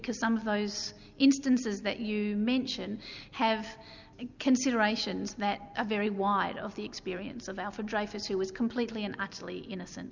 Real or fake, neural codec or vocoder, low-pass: real; none; 7.2 kHz